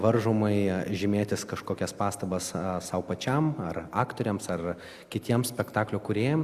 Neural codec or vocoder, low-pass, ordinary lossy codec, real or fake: none; 14.4 kHz; Opus, 64 kbps; real